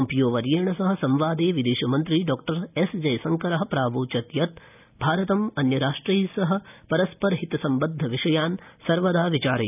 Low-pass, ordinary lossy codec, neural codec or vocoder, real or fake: 3.6 kHz; none; none; real